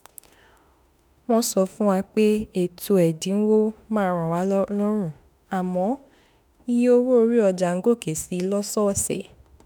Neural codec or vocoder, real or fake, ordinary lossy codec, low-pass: autoencoder, 48 kHz, 32 numbers a frame, DAC-VAE, trained on Japanese speech; fake; none; none